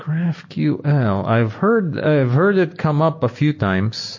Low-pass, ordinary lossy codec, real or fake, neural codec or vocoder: 7.2 kHz; MP3, 32 kbps; real; none